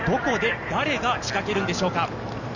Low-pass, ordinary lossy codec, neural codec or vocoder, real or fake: 7.2 kHz; none; none; real